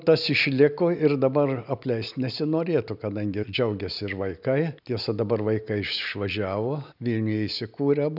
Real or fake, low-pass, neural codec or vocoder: real; 5.4 kHz; none